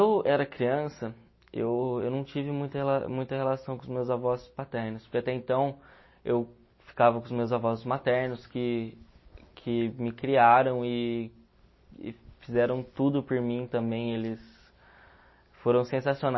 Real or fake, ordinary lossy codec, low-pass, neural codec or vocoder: real; MP3, 24 kbps; 7.2 kHz; none